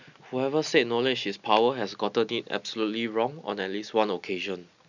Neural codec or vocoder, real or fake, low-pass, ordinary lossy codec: none; real; 7.2 kHz; none